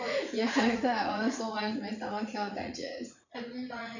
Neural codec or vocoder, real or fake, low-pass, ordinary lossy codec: vocoder, 44.1 kHz, 80 mel bands, Vocos; fake; 7.2 kHz; none